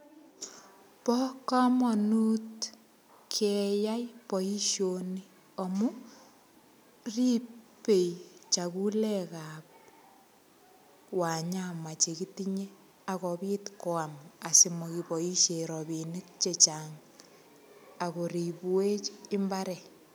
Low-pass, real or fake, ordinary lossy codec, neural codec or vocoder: none; real; none; none